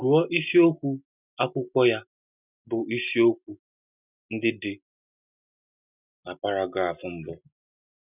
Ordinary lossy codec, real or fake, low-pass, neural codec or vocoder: none; real; 3.6 kHz; none